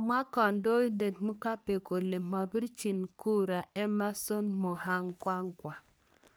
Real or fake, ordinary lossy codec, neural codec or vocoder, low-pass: fake; none; codec, 44.1 kHz, 3.4 kbps, Pupu-Codec; none